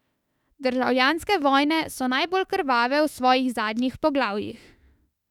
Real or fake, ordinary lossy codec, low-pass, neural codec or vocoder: fake; none; 19.8 kHz; autoencoder, 48 kHz, 32 numbers a frame, DAC-VAE, trained on Japanese speech